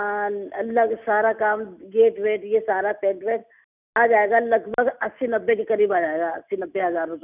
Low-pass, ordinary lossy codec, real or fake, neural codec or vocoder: 3.6 kHz; none; real; none